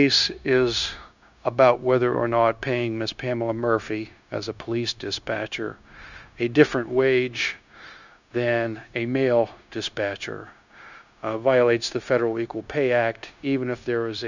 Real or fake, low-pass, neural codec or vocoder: fake; 7.2 kHz; codec, 16 kHz, 0.9 kbps, LongCat-Audio-Codec